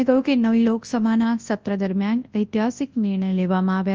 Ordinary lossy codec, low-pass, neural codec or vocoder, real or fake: Opus, 24 kbps; 7.2 kHz; codec, 24 kHz, 0.9 kbps, WavTokenizer, large speech release; fake